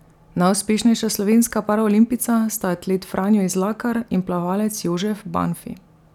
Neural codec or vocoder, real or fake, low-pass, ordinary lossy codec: none; real; 19.8 kHz; none